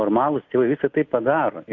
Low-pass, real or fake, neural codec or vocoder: 7.2 kHz; real; none